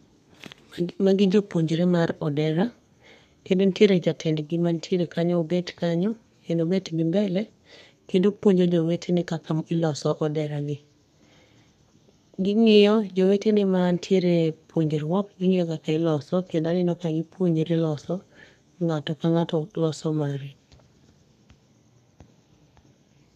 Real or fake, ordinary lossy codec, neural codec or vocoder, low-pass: fake; none; codec, 32 kHz, 1.9 kbps, SNAC; 14.4 kHz